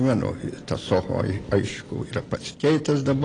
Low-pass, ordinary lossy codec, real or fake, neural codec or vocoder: 9.9 kHz; AAC, 32 kbps; real; none